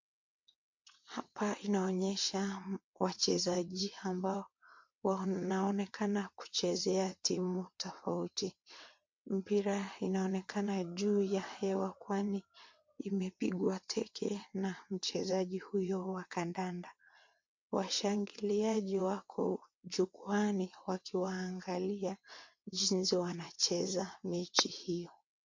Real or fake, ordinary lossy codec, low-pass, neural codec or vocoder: fake; MP3, 48 kbps; 7.2 kHz; vocoder, 22.05 kHz, 80 mel bands, WaveNeXt